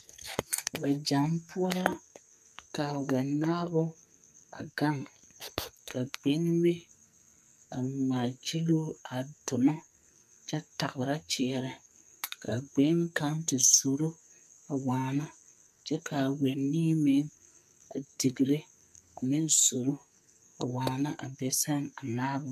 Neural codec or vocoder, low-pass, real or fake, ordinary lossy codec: codec, 44.1 kHz, 2.6 kbps, SNAC; 14.4 kHz; fake; MP3, 96 kbps